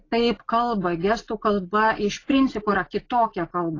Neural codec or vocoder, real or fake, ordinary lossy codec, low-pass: none; real; AAC, 32 kbps; 7.2 kHz